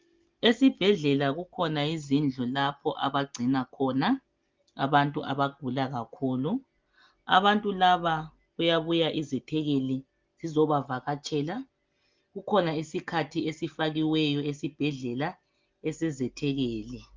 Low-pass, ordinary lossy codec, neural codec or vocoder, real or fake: 7.2 kHz; Opus, 32 kbps; none; real